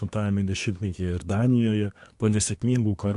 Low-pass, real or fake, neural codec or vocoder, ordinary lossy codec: 10.8 kHz; fake; codec, 24 kHz, 1 kbps, SNAC; AAC, 64 kbps